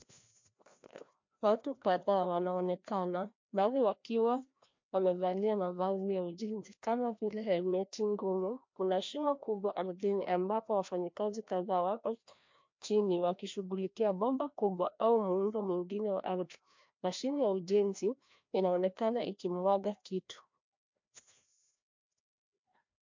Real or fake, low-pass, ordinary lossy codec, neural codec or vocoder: fake; 7.2 kHz; MP3, 48 kbps; codec, 16 kHz, 1 kbps, FreqCodec, larger model